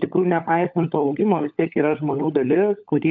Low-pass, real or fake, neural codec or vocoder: 7.2 kHz; fake; codec, 16 kHz, 16 kbps, FunCodec, trained on LibriTTS, 50 frames a second